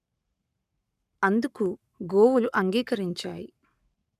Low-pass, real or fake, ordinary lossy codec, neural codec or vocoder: 14.4 kHz; fake; none; codec, 44.1 kHz, 7.8 kbps, Pupu-Codec